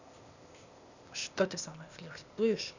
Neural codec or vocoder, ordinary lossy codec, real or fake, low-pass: codec, 16 kHz, 0.8 kbps, ZipCodec; none; fake; 7.2 kHz